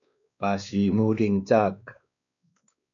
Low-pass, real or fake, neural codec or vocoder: 7.2 kHz; fake; codec, 16 kHz, 2 kbps, X-Codec, WavLM features, trained on Multilingual LibriSpeech